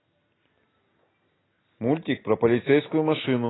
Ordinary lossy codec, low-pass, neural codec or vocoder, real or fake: AAC, 16 kbps; 7.2 kHz; none; real